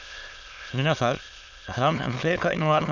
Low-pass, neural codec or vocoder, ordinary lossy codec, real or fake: 7.2 kHz; autoencoder, 22.05 kHz, a latent of 192 numbers a frame, VITS, trained on many speakers; none; fake